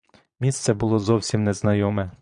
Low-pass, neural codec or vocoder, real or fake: 9.9 kHz; vocoder, 22.05 kHz, 80 mel bands, Vocos; fake